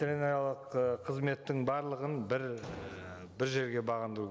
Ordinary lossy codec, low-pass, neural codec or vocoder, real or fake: none; none; none; real